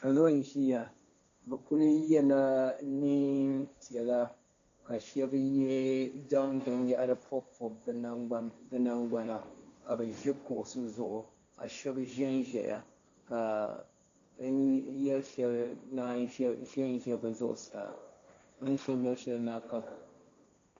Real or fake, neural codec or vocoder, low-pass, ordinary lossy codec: fake; codec, 16 kHz, 1.1 kbps, Voila-Tokenizer; 7.2 kHz; AAC, 48 kbps